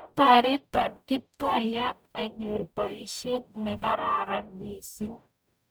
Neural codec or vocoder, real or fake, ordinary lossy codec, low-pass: codec, 44.1 kHz, 0.9 kbps, DAC; fake; none; none